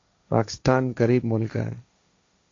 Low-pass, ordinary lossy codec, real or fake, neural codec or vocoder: 7.2 kHz; AAC, 48 kbps; fake; codec, 16 kHz, 1.1 kbps, Voila-Tokenizer